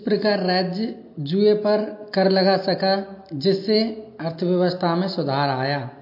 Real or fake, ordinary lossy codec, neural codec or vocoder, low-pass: real; MP3, 32 kbps; none; 5.4 kHz